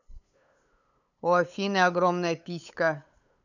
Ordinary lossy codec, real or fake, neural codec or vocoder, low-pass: none; fake; codec, 16 kHz, 8 kbps, FunCodec, trained on LibriTTS, 25 frames a second; 7.2 kHz